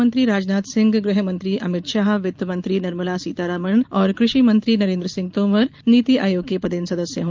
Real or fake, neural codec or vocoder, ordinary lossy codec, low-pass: real; none; Opus, 32 kbps; 7.2 kHz